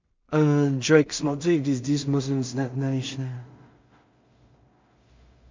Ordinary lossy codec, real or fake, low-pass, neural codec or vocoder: MP3, 64 kbps; fake; 7.2 kHz; codec, 16 kHz in and 24 kHz out, 0.4 kbps, LongCat-Audio-Codec, two codebook decoder